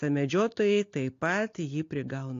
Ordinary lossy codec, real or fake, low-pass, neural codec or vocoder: AAC, 48 kbps; real; 7.2 kHz; none